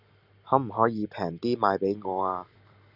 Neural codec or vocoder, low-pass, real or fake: none; 5.4 kHz; real